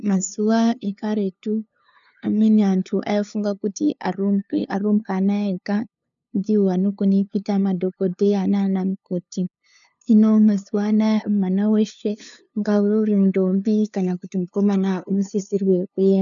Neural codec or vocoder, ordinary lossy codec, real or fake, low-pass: codec, 16 kHz, 2 kbps, FunCodec, trained on LibriTTS, 25 frames a second; MP3, 96 kbps; fake; 7.2 kHz